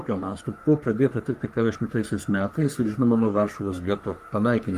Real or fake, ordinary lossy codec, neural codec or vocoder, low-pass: fake; Opus, 32 kbps; codec, 44.1 kHz, 3.4 kbps, Pupu-Codec; 14.4 kHz